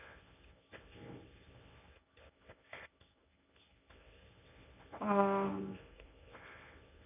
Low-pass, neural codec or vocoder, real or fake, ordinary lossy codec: 3.6 kHz; codec, 24 kHz, 0.9 kbps, WavTokenizer, medium speech release version 1; fake; none